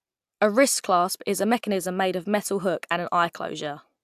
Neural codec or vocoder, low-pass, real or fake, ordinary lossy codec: none; 14.4 kHz; real; AAC, 96 kbps